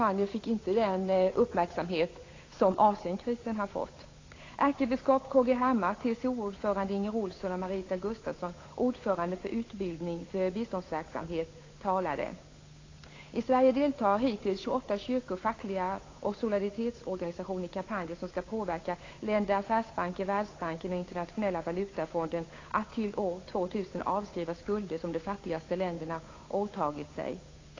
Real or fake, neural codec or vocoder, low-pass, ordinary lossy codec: fake; codec, 16 kHz, 8 kbps, FunCodec, trained on Chinese and English, 25 frames a second; 7.2 kHz; AAC, 32 kbps